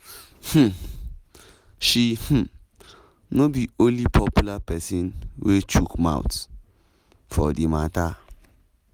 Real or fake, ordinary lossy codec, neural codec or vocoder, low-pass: real; none; none; 19.8 kHz